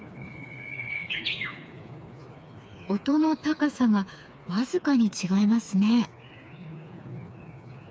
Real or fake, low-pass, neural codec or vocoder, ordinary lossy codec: fake; none; codec, 16 kHz, 4 kbps, FreqCodec, smaller model; none